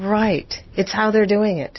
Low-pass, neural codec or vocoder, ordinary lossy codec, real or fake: 7.2 kHz; none; MP3, 24 kbps; real